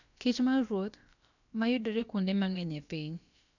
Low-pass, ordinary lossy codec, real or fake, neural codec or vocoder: 7.2 kHz; none; fake; codec, 16 kHz, about 1 kbps, DyCAST, with the encoder's durations